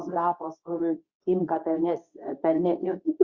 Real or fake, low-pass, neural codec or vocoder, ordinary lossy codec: fake; 7.2 kHz; codec, 24 kHz, 0.9 kbps, WavTokenizer, medium speech release version 2; Opus, 64 kbps